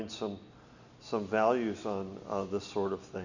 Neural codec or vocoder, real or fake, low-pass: none; real; 7.2 kHz